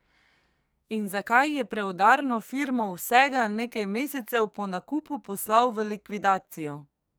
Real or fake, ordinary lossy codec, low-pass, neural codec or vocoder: fake; none; none; codec, 44.1 kHz, 2.6 kbps, SNAC